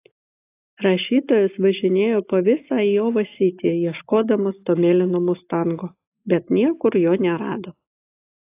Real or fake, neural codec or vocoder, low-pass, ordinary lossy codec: real; none; 3.6 kHz; AAC, 24 kbps